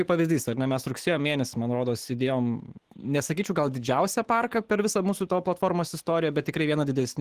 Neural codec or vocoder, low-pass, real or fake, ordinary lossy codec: autoencoder, 48 kHz, 128 numbers a frame, DAC-VAE, trained on Japanese speech; 14.4 kHz; fake; Opus, 16 kbps